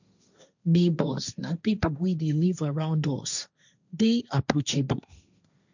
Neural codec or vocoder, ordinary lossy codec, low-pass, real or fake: codec, 16 kHz, 1.1 kbps, Voila-Tokenizer; none; 7.2 kHz; fake